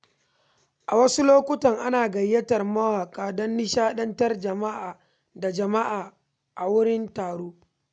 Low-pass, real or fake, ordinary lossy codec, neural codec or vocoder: 9.9 kHz; real; none; none